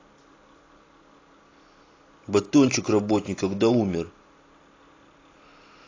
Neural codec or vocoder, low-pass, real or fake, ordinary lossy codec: none; 7.2 kHz; real; AAC, 32 kbps